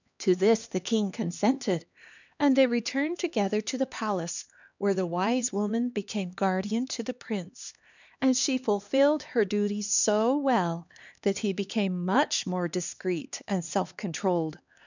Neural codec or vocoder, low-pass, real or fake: codec, 16 kHz, 2 kbps, X-Codec, HuBERT features, trained on LibriSpeech; 7.2 kHz; fake